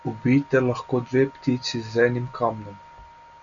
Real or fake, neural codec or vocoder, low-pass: real; none; 7.2 kHz